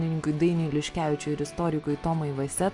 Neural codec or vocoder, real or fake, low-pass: none; real; 10.8 kHz